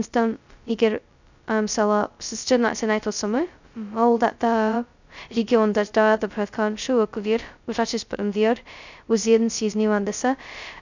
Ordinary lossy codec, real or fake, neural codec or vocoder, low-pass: none; fake; codec, 16 kHz, 0.2 kbps, FocalCodec; 7.2 kHz